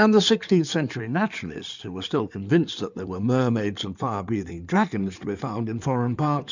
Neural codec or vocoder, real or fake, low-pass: codec, 16 kHz in and 24 kHz out, 2.2 kbps, FireRedTTS-2 codec; fake; 7.2 kHz